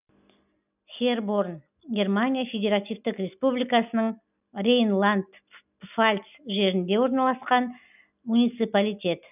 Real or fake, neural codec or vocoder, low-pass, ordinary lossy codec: real; none; 3.6 kHz; none